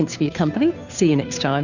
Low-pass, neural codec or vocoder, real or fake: 7.2 kHz; codec, 16 kHz, 2 kbps, FunCodec, trained on Chinese and English, 25 frames a second; fake